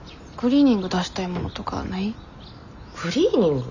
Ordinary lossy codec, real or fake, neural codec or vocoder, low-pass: none; real; none; 7.2 kHz